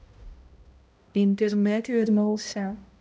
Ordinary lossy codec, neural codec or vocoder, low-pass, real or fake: none; codec, 16 kHz, 0.5 kbps, X-Codec, HuBERT features, trained on balanced general audio; none; fake